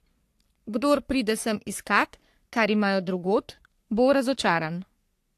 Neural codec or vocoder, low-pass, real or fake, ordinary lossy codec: codec, 44.1 kHz, 3.4 kbps, Pupu-Codec; 14.4 kHz; fake; AAC, 64 kbps